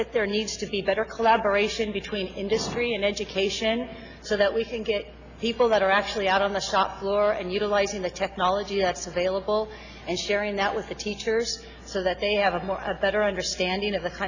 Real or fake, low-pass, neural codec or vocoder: real; 7.2 kHz; none